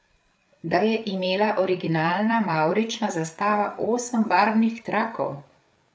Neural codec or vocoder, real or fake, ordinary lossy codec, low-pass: codec, 16 kHz, 8 kbps, FreqCodec, larger model; fake; none; none